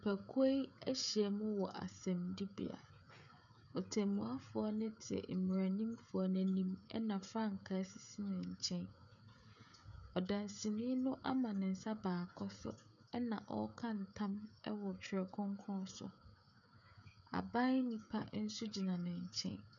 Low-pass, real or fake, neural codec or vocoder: 7.2 kHz; fake; codec, 16 kHz, 16 kbps, FreqCodec, smaller model